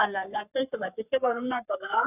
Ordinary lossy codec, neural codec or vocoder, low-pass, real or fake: none; codec, 44.1 kHz, 3.4 kbps, Pupu-Codec; 3.6 kHz; fake